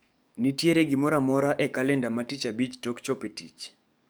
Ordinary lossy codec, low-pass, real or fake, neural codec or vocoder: none; none; fake; codec, 44.1 kHz, 7.8 kbps, DAC